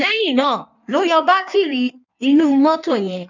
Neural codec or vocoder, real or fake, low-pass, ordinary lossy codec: codec, 16 kHz in and 24 kHz out, 1.1 kbps, FireRedTTS-2 codec; fake; 7.2 kHz; none